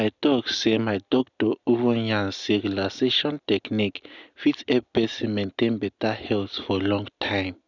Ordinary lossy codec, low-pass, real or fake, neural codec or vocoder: none; 7.2 kHz; real; none